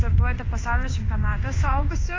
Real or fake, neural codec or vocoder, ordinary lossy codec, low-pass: fake; codec, 16 kHz in and 24 kHz out, 1 kbps, XY-Tokenizer; AAC, 32 kbps; 7.2 kHz